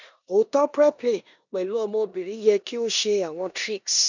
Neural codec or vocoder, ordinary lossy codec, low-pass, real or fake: codec, 16 kHz in and 24 kHz out, 0.9 kbps, LongCat-Audio-Codec, four codebook decoder; none; 7.2 kHz; fake